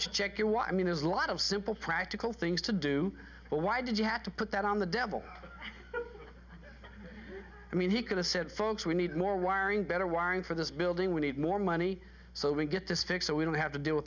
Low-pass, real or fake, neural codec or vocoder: 7.2 kHz; real; none